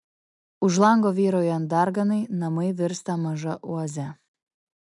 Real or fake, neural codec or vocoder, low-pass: real; none; 10.8 kHz